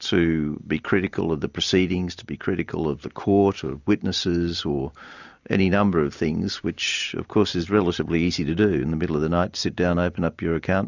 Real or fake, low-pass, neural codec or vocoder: real; 7.2 kHz; none